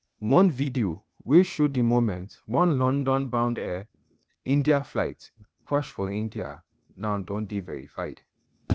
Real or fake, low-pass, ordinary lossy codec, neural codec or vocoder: fake; none; none; codec, 16 kHz, 0.8 kbps, ZipCodec